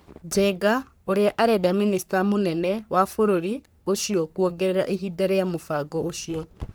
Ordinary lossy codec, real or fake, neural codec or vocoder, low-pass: none; fake; codec, 44.1 kHz, 3.4 kbps, Pupu-Codec; none